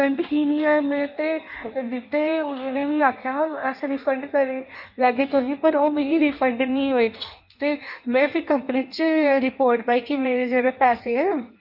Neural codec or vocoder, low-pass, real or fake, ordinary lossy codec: codec, 16 kHz in and 24 kHz out, 1.1 kbps, FireRedTTS-2 codec; 5.4 kHz; fake; none